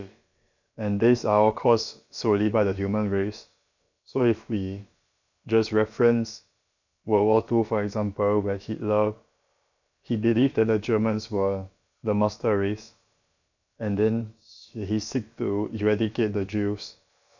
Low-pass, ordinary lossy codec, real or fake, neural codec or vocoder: 7.2 kHz; none; fake; codec, 16 kHz, about 1 kbps, DyCAST, with the encoder's durations